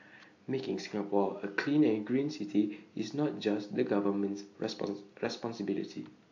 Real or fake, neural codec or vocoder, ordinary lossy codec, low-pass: real; none; AAC, 48 kbps; 7.2 kHz